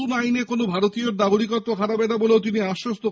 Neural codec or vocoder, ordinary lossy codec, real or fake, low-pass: none; none; real; none